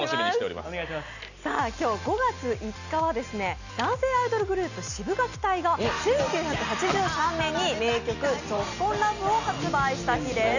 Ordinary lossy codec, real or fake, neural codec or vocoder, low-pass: none; real; none; 7.2 kHz